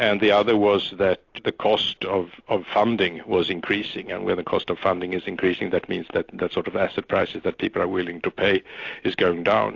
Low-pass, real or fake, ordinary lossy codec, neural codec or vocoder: 7.2 kHz; real; AAC, 48 kbps; none